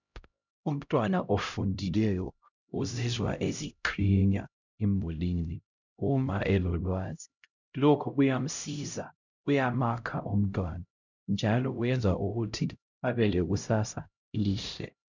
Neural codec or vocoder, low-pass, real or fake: codec, 16 kHz, 0.5 kbps, X-Codec, HuBERT features, trained on LibriSpeech; 7.2 kHz; fake